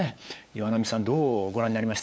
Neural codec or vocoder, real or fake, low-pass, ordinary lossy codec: codec, 16 kHz, 8 kbps, FunCodec, trained on LibriTTS, 25 frames a second; fake; none; none